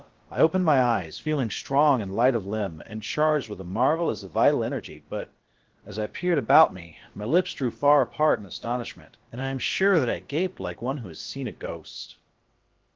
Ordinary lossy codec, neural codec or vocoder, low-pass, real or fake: Opus, 16 kbps; codec, 16 kHz, about 1 kbps, DyCAST, with the encoder's durations; 7.2 kHz; fake